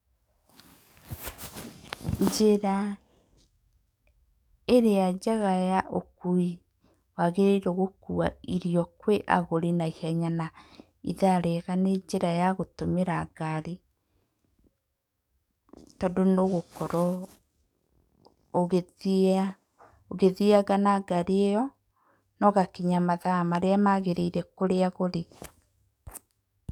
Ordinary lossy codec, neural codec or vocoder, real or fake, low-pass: none; codec, 44.1 kHz, 7.8 kbps, DAC; fake; 19.8 kHz